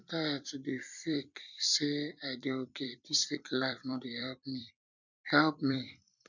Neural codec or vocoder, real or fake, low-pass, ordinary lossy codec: vocoder, 44.1 kHz, 128 mel bands every 256 samples, BigVGAN v2; fake; 7.2 kHz; none